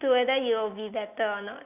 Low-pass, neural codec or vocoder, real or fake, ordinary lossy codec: 3.6 kHz; none; real; none